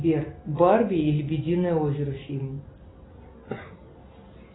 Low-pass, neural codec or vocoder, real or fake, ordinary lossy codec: 7.2 kHz; none; real; AAC, 16 kbps